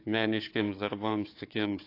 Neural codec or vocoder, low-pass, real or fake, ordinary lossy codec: codec, 16 kHz, 4 kbps, FunCodec, trained on Chinese and English, 50 frames a second; 5.4 kHz; fake; AAC, 32 kbps